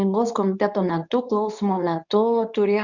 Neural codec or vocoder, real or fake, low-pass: codec, 24 kHz, 0.9 kbps, WavTokenizer, medium speech release version 2; fake; 7.2 kHz